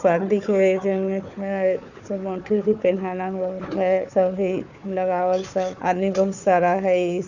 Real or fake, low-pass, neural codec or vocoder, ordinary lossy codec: fake; 7.2 kHz; codec, 16 kHz, 4 kbps, FunCodec, trained on Chinese and English, 50 frames a second; none